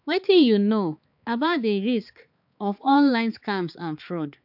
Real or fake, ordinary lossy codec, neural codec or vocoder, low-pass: fake; AAC, 48 kbps; codec, 16 kHz, 4 kbps, X-Codec, HuBERT features, trained on balanced general audio; 5.4 kHz